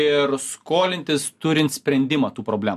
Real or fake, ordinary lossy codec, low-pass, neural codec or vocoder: real; AAC, 96 kbps; 14.4 kHz; none